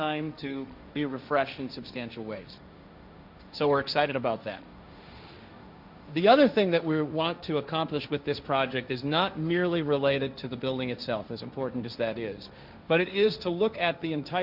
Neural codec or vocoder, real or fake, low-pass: codec, 16 kHz, 1.1 kbps, Voila-Tokenizer; fake; 5.4 kHz